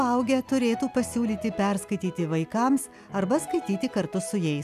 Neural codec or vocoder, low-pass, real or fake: none; 14.4 kHz; real